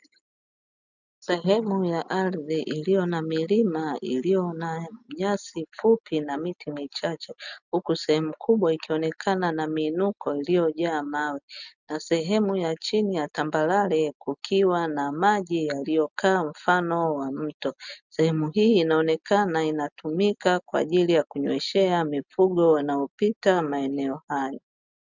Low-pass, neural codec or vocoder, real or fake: 7.2 kHz; none; real